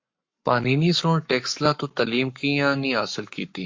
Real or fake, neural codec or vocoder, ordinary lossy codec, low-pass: fake; codec, 44.1 kHz, 7.8 kbps, Pupu-Codec; MP3, 48 kbps; 7.2 kHz